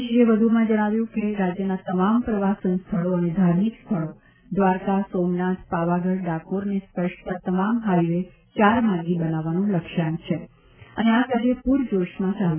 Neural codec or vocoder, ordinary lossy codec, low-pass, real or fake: none; none; 3.6 kHz; real